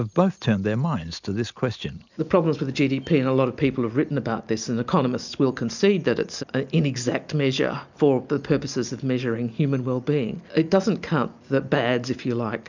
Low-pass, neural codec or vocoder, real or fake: 7.2 kHz; none; real